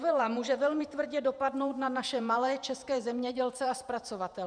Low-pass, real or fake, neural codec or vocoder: 9.9 kHz; fake; vocoder, 48 kHz, 128 mel bands, Vocos